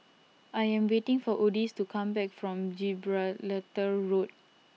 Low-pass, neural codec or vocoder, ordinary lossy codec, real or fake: none; none; none; real